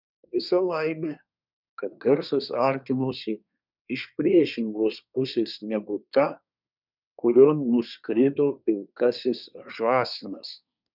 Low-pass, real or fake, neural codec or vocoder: 5.4 kHz; fake; codec, 24 kHz, 1 kbps, SNAC